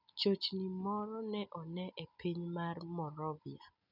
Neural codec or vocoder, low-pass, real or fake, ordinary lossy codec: none; 5.4 kHz; real; none